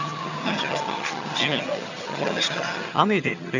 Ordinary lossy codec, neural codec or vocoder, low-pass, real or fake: none; vocoder, 22.05 kHz, 80 mel bands, HiFi-GAN; 7.2 kHz; fake